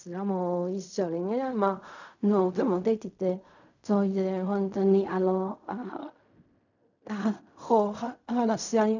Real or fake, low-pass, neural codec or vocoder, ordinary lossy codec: fake; 7.2 kHz; codec, 16 kHz in and 24 kHz out, 0.4 kbps, LongCat-Audio-Codec, fine tuned four codebook decoder; none